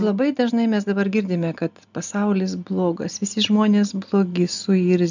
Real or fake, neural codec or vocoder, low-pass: real; none; 7.2 kHz